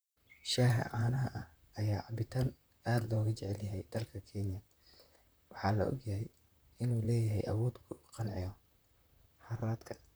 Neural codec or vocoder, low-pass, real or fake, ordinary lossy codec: vocoder, 44.1 kHz, 128 mel bands, Pupu-Vocoder; none; fake; none